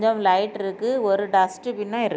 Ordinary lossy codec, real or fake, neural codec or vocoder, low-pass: none; real; none; none